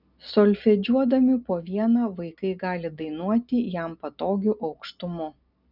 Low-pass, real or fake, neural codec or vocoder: 5.4 kHz; real; none